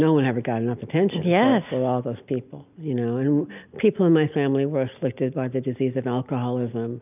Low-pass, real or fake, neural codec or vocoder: 3.6 kHz; real; none